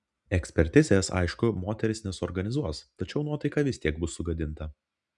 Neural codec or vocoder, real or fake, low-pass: none; real; 10.8 kHz